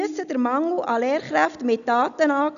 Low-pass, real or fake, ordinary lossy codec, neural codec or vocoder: 7.2 kHz; real; none; none